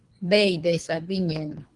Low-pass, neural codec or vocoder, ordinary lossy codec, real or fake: 10.8 kHz; codec, 24 kHz, 3 kbps, HILCodec; Opus, 24 kbps; fake